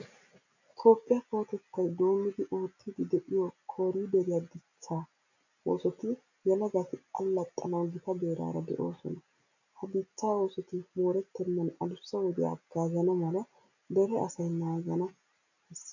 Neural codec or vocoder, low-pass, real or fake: none; 7.2 kHz; real